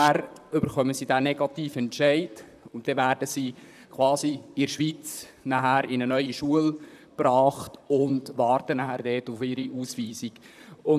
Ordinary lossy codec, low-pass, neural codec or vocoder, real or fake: none; 14.4 kHz; vocoder, 44.1 kHz, 128 mel bands, Pupu-Vocoder; fake